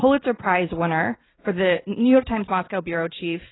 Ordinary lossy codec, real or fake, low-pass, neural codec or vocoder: AAC, 16 kbps; real; 7.2 kHz; none